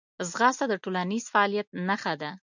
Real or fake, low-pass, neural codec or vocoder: real; 7.2 kHz; none